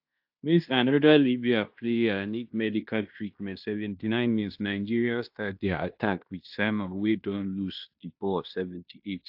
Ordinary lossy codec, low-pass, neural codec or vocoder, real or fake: AAC, 48 kbps; 5.4 kHz; codec, 16 kHz in and 24 kHz out, 0.9 kbps, LongCat-Audio-Codec, fine tuned four codebook decoder; fake